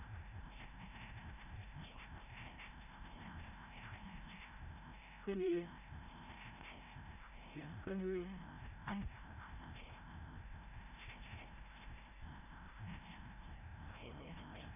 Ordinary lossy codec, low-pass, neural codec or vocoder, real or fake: none; 3.6 kHz; codec, 16 kHz, 0.5 kbps, FreqCodec, larger model; fake